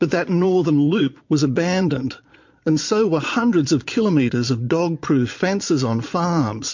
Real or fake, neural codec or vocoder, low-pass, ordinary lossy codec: fake; vocoder, 22.05 kHz, 80 mel bands, Vocos; 7.2 kHz; MP3, 48 kbps